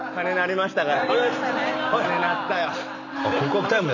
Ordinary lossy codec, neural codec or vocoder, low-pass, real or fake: none; none; 7.2 kHz; real